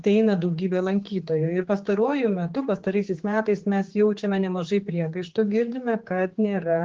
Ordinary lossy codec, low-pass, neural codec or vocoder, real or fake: Opus, 16 kbps; 7.2 kHz; codec, 16 kHz, 4 kbps, X-Codec, HuBERT features, trained on general audio; fake